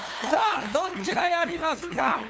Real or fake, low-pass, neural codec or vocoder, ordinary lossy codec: fake; none; codec, 16 kHz, 2 kbps, FunCodec, trained on LibriTTS, 25 frames a second; none